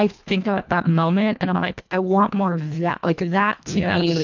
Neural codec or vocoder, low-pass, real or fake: codec, 24 kHz, 1.5 kbps, HILCodec; 7.2 kHz; fake